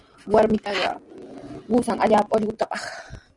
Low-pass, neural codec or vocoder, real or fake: 10.8 kHz; none; real